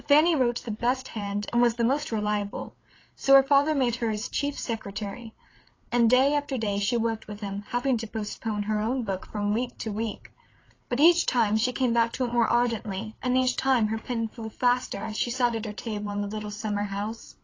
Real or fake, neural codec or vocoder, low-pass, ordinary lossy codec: fake; codec, 16 kHz, 8 kbps, FreqCodec, larger model; 7.2 kHz; AAC, 32 kbps